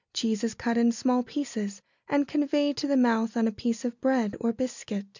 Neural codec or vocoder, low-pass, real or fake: none; 7.2 kHz; real